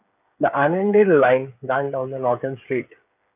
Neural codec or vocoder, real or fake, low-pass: codec, 16 kHz, 8 kbps, FreqCodec, smaller model; fake; 3.6 kHz